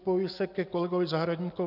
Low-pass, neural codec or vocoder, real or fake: 5.4 kHz; none; real